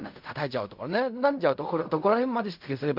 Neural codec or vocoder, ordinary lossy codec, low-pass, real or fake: codec, 16 kHz in and 24 kHz out, 0.4 kbps, LongCat-Audio-Codec, fine tuned four codebook decoder; MP3, 48 kbps; 5.4 kHz; fake